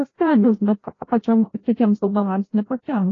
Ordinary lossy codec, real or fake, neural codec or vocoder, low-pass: AAC, 32 kbps; fake; codec, 16 kHz, 0.5 kbps, FreqCodec, larger model; 7.2 kHz